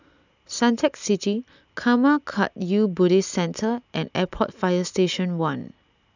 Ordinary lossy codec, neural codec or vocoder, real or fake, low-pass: none; none; real; 7.2 kHz